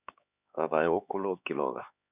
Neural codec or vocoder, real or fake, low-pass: codec, 16 kHz, 4 kbps, X-Codec, HuBERT features, trained on LibriSpeech; fake; 3.6 kHz